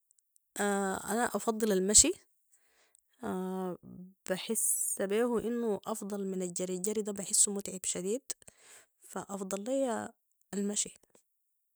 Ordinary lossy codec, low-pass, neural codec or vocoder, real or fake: none; none; none; real